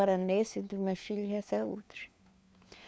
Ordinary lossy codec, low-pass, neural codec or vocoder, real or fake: none; none; codec, 16 kHz, 4 kbps, FunCodec, trained on LibriTTS, 50 frames a second; fake